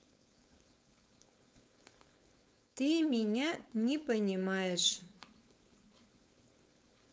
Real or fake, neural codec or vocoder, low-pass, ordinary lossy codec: fake; codec, 16 kHz, 4.8 kbps, FACodec; none; none